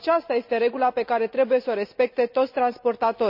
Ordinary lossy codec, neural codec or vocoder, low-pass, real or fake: none; none; 5.4 kHz; real